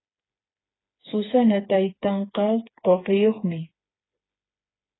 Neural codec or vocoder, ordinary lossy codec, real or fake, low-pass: codec, 16 kHz, 4 kbps, FreqCodec, smaller model; AAC, 16 kbps; fake; 7.2 kHz